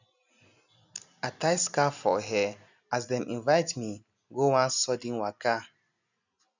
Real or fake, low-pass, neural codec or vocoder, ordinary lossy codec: real; 7.2 kHz; none; none